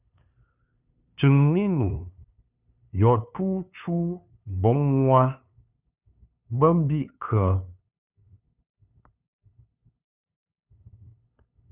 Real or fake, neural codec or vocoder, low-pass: fake; codec, 16 kHz, 2 kbps, FunCodec, trained on LibriTTS, 25 frames a second; 3.6 kHz